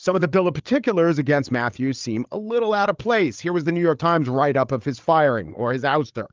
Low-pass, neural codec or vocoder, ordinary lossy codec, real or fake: 7.2 kHz; codec, 16 kHz, 8 kbps, FreqCodec, larger model; Opus, 32 kbps; fake